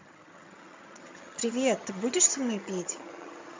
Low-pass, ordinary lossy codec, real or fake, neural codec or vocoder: 7.2 kHz; MP3, 64 kbps; fake; vocoder, 22.05 kHz, 80 mel bands, HiFi-GAN